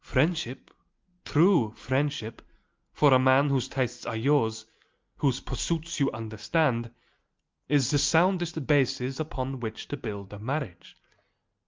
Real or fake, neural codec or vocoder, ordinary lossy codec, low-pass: real; none; Opus, 24 kbps; 7.2 kHz